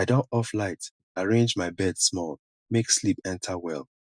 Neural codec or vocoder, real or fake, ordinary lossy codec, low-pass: none; real; none; 9.9 kHz